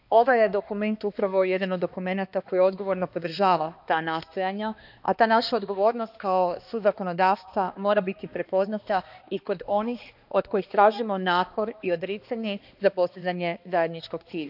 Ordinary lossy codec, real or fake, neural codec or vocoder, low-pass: none; fake; codec, 16 kHz, 2 kbps, X-Codec, HuBERT features, trained on balanced general audio; 5.4 kHz